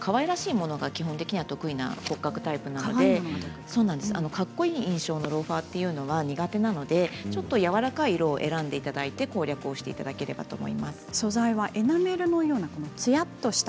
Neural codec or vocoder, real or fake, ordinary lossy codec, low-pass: none; real; none; none